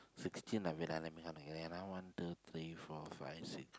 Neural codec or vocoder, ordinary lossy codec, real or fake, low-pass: none; none; real; none